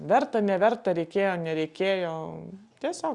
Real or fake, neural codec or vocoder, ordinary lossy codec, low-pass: real; none; Opus, 64 kbps; 10.8 kHz